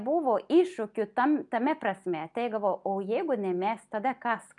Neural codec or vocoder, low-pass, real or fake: none; 10.8 kHz; real